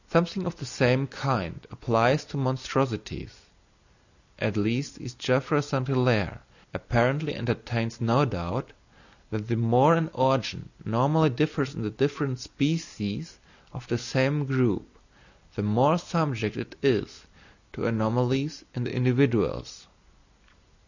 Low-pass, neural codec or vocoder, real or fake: 7.2 kHz; none; real